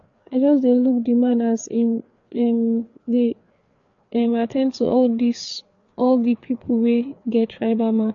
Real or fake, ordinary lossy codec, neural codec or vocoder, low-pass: fake; AAC, 48 kbps; codec, 16 kHz, 4 kbps, FreqCodec, larger model; 7.2 kHz